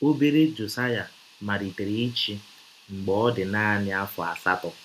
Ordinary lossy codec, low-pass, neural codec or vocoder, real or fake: none; 14.4 kHz; none; real